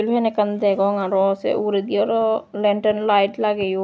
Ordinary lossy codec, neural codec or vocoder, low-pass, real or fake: none; none; none; real